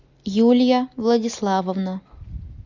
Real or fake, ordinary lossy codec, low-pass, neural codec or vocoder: real; MP3, 64 kbps; 7.2 kHz; none